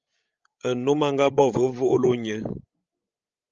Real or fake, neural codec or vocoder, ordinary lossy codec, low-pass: fake; codec, 16 kHz, 16 kbps, FreqCodec, larger model; Opus, 24 kbps; 7.2 kHz